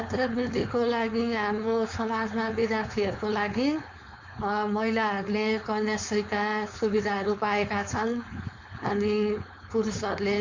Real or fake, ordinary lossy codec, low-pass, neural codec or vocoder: fake; MP3, 48 kbps; 7.2 kHz; codec, 16 kHz, 4.8 kbps, FACodec